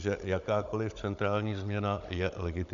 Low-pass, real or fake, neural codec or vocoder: 7.2 kHz; fake; codec, 16 kHz, 16 kbps, FunCodec, trained on Chinese and English, 50 frames a second